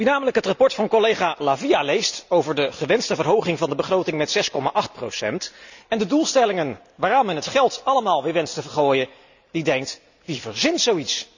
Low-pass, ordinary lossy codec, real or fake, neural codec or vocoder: 7.2 kHz; none; real; none